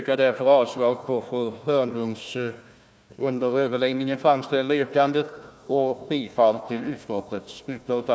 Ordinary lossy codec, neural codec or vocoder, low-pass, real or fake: none; codec, 16 kHz, 1 kbps, FunCodec, trained on Chinese and English, 50 frames a second; none; fake